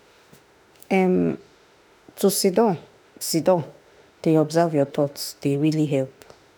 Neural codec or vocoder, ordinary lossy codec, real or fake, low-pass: autoencoder, 48 kHz, 32 numbers a frame, DAC-VAE, trained on Japanese speech; none; fake; none